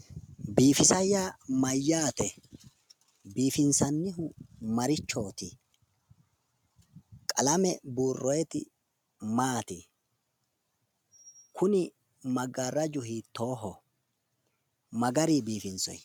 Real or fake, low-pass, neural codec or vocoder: real; 19.8 kHz; none